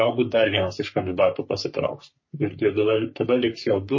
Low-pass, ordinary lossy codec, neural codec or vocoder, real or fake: 7.2 kHz; MP3, 32 kbps; codec, 44.1 kHz, 3.4 kbps, Pupu-Codec; fake